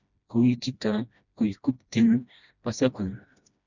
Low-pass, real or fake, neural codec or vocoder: 7.2 kHz; fake; codec, 16 kHz, 1 kbps, FreqCodec, smaller model